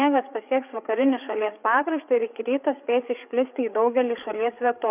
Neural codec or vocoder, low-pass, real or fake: codec, 16 kHz, 8 kbps, FreqCodec, larger model; 3.6 kHz; fake